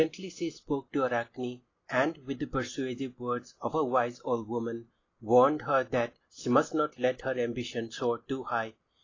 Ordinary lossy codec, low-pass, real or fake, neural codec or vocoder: AAC, 32 kbps; 7.2 kHz; real; none